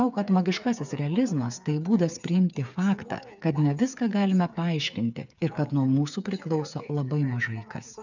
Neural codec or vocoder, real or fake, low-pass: codec, 16 kHz, 8 kbps, FreqCodec, smaller model; fake; 7.2 kHz